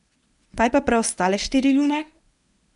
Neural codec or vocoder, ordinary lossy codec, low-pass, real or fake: codec, 24 kHz, 0.9 kbps, WavTokenizer, medium speech release version 1; none; 10.8 kHz; fake